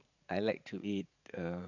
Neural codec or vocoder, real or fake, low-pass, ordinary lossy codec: vocoder, 44.1 kHz, 80 mel bands, Vocos; fake; 7.2 kHz; none